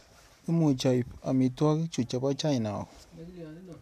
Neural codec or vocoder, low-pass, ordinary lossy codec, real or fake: none; 14.4 kHz; none; real